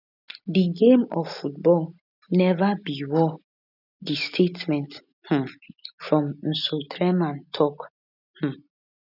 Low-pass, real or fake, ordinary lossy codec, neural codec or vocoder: 5.4 kHz; real; none; none